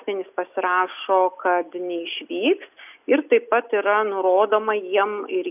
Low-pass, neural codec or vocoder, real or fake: 3.6 kHz; none; real